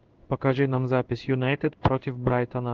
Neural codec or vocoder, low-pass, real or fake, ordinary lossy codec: codec, 16 kHz in and 24 kHz out, 1 kbps, XY-Tokenizer; 7.2 kHz; fake; Opus, 16 kbps